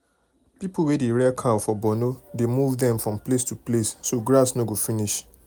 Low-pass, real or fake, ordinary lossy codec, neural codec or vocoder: none; real; none; none